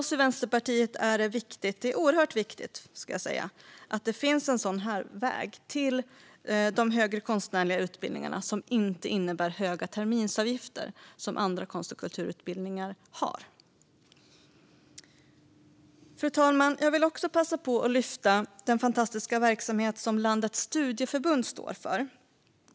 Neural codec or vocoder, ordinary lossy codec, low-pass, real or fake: none; none; none; real